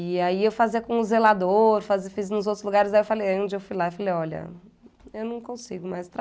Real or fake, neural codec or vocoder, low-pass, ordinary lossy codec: real; none; none; none